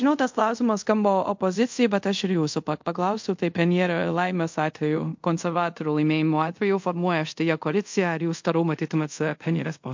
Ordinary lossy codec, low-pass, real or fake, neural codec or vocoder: MP3, 48 kbps; 7.2 kHz; fake; codec, 24 kHz, 0.5 kbps, DualCodec